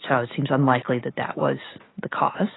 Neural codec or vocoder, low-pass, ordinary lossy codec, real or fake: none; 7.2 kHz; AAC, 16 kbps; real